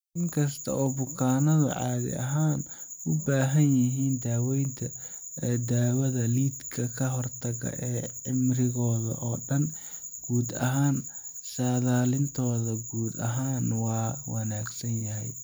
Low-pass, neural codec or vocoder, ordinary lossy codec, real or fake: none; none; none; real